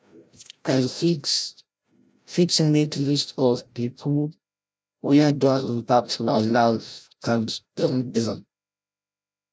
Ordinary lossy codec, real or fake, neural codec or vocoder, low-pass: none; fake; codec, 16 kHz, 0.5 kbps, FreqCodec, larger model; none